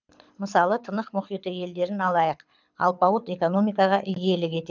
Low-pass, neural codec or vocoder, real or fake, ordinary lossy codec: 7.2 kHz; codec, 24 kHz, 6 kbps, HILCodec; fake; none